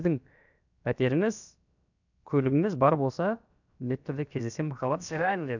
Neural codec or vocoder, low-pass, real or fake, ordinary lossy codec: codec, 16 kHz, about 1 kbps, DyCAST, with the encoder's durations; 7.2 kHz; fake; none